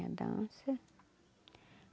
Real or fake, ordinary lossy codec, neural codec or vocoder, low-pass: real; none; none; none